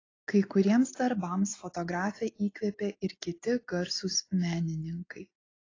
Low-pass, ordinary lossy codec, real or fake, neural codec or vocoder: 7.2 kHz; AAC, 32 kbps; real; none